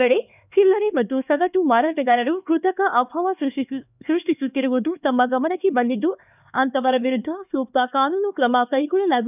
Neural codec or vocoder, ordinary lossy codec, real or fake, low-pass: codec, 16 kHz, 2 kbps, X-Codec, HuBERT features, trained on LibriSpeech; none; fake; 3.6 kHz